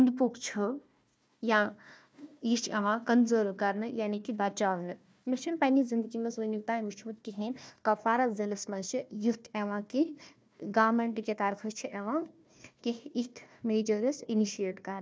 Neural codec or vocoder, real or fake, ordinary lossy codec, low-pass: codec, 16 kHz, 1 kbps, FunCodec, trained on Chinese and English, 50 frames a second; fake; none; none